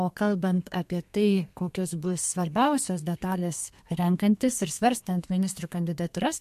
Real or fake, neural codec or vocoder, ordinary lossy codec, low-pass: fake; codec, 32 kHz, 1.9 kbps, SNAC; MP3, 64 kbps; 14.4 kHz